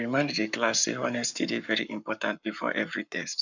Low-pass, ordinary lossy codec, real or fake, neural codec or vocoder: 7.2 kHz; none; fake; codec, 44.1 kHz, 7.8 kbps, Pupu-Codec